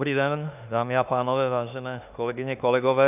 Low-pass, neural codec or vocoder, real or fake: 3.6 kHz; autoencoder, 48 kHz, 32 numbers a frame, DAC-VAE, trained on Japanese speech; fake